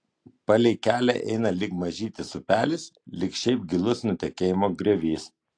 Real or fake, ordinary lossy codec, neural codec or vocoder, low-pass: real; AAC, 48 kbps; none; 9.9 kHz